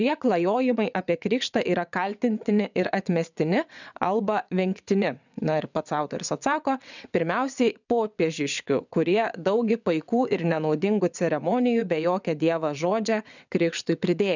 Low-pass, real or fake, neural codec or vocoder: 7.2 kHz; fake; vocoder, 22.05 kHz, 80 mel bands, WaveNeXt